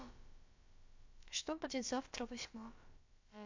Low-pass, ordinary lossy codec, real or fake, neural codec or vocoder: 7.2 kHz; AAC, 48 kbps; fake; codec, 16 kHz, about 1 kbps, DyCAST, with the encoder's durations